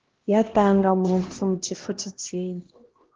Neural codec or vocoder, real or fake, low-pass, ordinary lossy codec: codec, 16 kHz, 1 kbps, X-Codec, HuBERT features, trained on LibriSpeech; fake; 7.2 kHz; Opus, 16 kbps